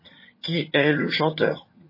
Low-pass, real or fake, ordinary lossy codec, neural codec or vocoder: 5.4 kHz; fake; MP3, 24 kbps; vocoder, 22.05 kHz, 80 mel bands, HiFi-GAN